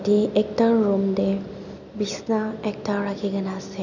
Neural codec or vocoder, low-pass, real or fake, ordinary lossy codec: none; 7.2 kHz; real; none